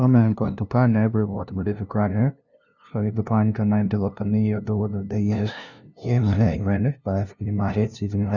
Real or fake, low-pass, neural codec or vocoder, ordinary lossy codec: fake; 7.2 kHz; codec, 16 kHz, 0.5 kbps, FunCodec, trained on LibriTTS, 25 frames a second; Opus, 64 kbps